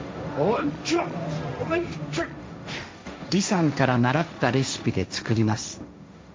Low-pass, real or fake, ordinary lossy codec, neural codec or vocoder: none; fake; none; codec, 16 kHz, 1.1 kbps, Voila-Tokenizer